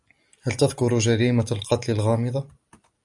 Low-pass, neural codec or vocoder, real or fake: 10.8 kHz; none; real